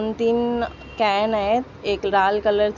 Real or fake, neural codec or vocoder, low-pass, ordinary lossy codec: real; none; 7.2 kHz; none